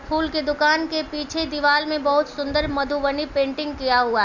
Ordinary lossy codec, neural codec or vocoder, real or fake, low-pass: none; none; real; 7.2 kHz